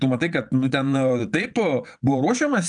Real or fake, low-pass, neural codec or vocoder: fake; 9.9 kHz; vocoder, 22.05 kHz, 80 mel bands, Vocos